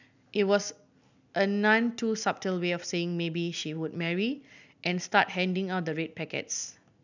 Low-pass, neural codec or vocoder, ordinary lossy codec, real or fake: 7.2 kHz; none; none; real